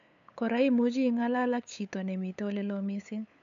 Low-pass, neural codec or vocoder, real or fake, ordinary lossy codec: 7.2 kHz; codec, 16 kHz, 8 kbps, FunCodec, trained on LibriTTS, 25 frames a second; fake; none